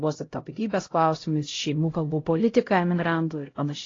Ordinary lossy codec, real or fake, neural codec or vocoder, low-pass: AAC, 32 kbps; fake; codec, 16 kHz, 0.5 kbps, X-Codec, HuBERT features, trained on LibriSpeech; 7.2 kHz